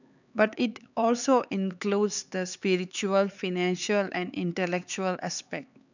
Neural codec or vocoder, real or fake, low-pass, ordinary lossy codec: codec, 16 kHz, 4 kbps, X-Codec, HuBERT features, trained on LibriSpeech; fake; 7.2 kHz; none